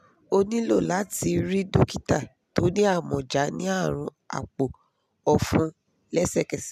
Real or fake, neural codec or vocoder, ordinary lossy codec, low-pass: real; none; none; 14.4 kHz